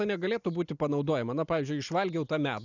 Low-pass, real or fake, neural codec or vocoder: 7.2 kHz; real; none